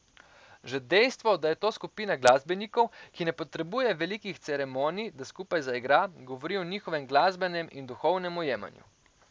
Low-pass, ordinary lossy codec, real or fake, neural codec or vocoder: none; none; real; none